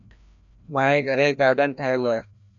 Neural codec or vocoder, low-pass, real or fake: codec, 16 kHz, 1 kbps, FreqCodec, larger model; 7.2 kHz; fake